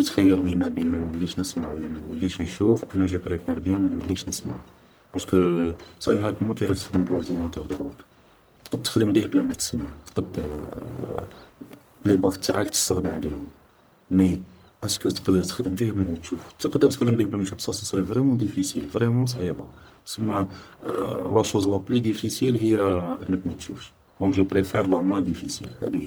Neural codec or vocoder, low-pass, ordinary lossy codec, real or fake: codec, 44.1 kHz, 1.7 kbps, Pupu-Codec; none; none; fake